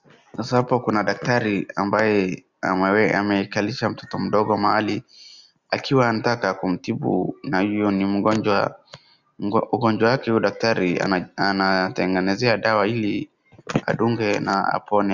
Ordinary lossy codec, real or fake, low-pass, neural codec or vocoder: Opus, 64 kbps; real; 7.2 kHz; none